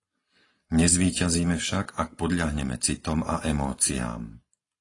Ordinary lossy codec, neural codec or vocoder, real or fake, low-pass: AAC, 32 kbps; none; real; 10.8 kHz